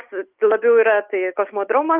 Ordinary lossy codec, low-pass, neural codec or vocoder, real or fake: Opus, 32 kbps; 3.6 kHz; none; real